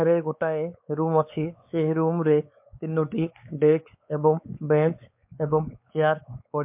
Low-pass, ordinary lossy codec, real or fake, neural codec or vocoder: 3.6 kHz; MP3, 32 kbps; fake; codec, 16 kHz, 4 kbps, X-Codec, WavLM features, trained on Multilingual LibriSpeech